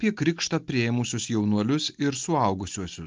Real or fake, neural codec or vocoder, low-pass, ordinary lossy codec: real; none; 7.2 kHz; Opus, 32 kbps